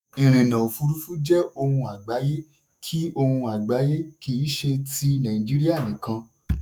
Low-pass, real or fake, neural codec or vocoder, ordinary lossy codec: none; fake; autoencoder, 48 kHz, 128 numbers a frame, DAC-VAE, trained on Japanese speech; none